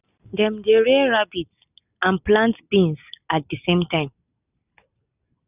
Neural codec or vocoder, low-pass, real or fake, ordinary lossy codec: none; 3.6 kHz; real; none